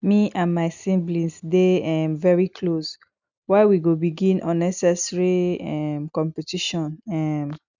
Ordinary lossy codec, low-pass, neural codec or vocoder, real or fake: none; 7.2 kHz; none; real